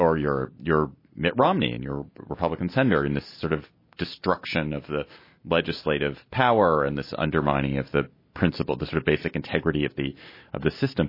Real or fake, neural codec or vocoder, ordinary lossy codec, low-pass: real; none; MP3, 24 kbps; 5.4 kHz